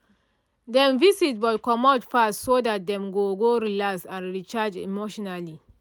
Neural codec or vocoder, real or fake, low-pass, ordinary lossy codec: none; real; none; none